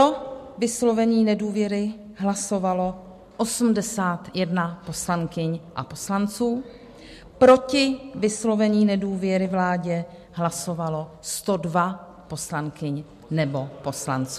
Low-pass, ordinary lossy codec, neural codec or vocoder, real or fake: 14.4 kHz; MP3, 64 kbps; none; real